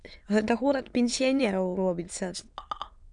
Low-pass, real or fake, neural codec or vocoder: 9.9 kHz; fake; autoencoder, 22.05 kHz, a latent of 192 numbers a frame, VITS, trained on many speakers